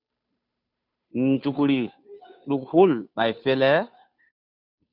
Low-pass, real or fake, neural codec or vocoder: 5.4 kHz; fake; codec, 16 kHz, 2 kbps, FunCodec, trained on Chinese and English, 25 frames a second